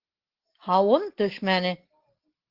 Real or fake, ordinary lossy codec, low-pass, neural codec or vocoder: real; Opus, 16 kbps; 5.4 kHz; none